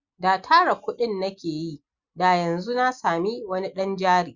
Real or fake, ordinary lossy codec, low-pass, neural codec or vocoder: real; Opus, 64 kbps; 7.2 kHz; none